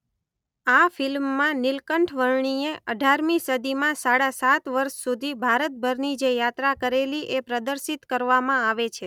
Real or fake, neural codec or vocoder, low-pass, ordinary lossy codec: real; none; 19.8 kHz; none